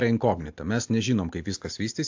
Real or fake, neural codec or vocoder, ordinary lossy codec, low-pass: real; none; AAC, 48 kbps; 7.2 kHz